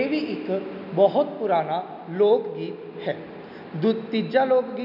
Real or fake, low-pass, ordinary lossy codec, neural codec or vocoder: real; 5.4 kHz; none; none